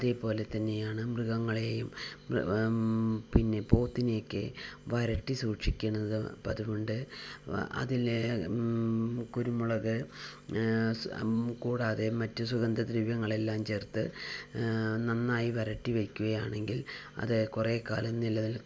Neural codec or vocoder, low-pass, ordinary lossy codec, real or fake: none; none; none; real